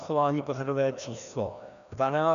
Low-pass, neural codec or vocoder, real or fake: 7.2 kHz; codec, 16 kHz, 1 kbps, FreqCodec, larger model; fake